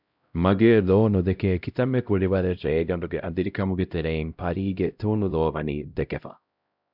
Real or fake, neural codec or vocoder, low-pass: fake; codec, 16 kHz, 0.5 kbps, X-Codec, HuBERT features, trained on LibriSpeech; 5.4 kHz